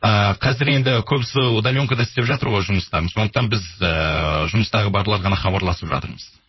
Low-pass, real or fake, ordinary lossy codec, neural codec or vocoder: 7.2 kHz; fake; MP3, 24 kbps; codec, 16 kHz, 4.8 kbps, FACodec